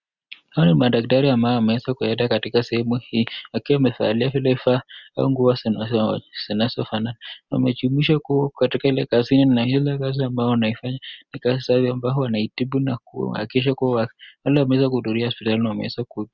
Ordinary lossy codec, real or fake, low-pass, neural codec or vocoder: Opus, 64 kbps; real; 7.2 kHz; none